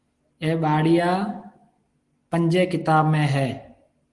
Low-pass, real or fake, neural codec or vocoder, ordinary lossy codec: 10.8 kHz; real; none; Opus, 24 kbps